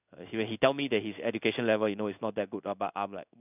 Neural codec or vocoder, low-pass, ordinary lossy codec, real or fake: codec, 16 kHz in and 24 kHz out, 1 kbps, XY-Tokenizer; 3.6 kHz; none; fake